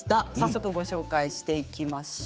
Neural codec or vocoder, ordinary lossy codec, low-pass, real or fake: codec, 16 kHz, 4 kbps, X-Codec, HuBERT features, trained on general audio; none; none; fake